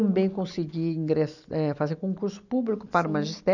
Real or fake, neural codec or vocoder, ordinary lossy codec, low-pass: real; none; none; 7.2 kHz